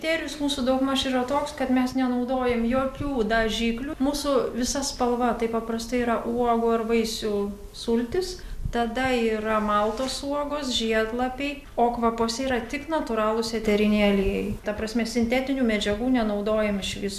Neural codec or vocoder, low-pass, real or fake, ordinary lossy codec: none; 14.4 kHz; real; MP3, 96 kbps